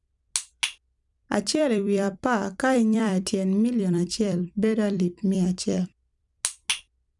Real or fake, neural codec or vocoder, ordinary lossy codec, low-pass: fake; vocoder, 44.1 kHz, 128 mel bands every 256 samples, BigVGAN v2; none; 10.8 kHz